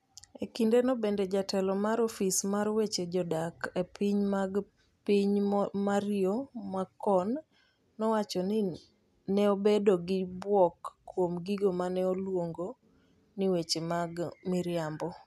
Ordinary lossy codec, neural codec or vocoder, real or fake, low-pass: none; none; real; 14.4 kHz